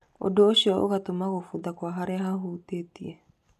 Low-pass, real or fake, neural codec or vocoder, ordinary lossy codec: 14.4 kHz; real; none; none